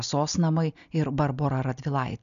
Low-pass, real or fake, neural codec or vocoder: 7.2 kHz; real; none